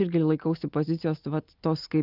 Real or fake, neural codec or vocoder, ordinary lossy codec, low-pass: real; none; Opus, 24 kbps; 5.4 kHz